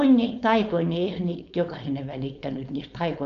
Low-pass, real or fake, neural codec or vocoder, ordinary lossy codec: 7.2 kHz; fake; codec, 16 kHz, 4.8 kbps, FACodec; none